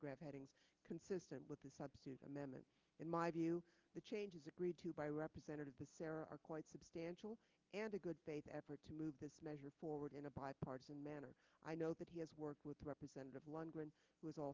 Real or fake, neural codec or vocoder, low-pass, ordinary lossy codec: real; none; 7.2 kHz; Opus, 32 kbps